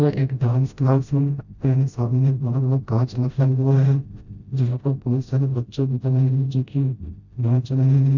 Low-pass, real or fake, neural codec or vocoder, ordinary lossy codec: 7.2 kHz; fake; codec, 16 kHz, 0.5 kbps, FreqCodec, smaller model; none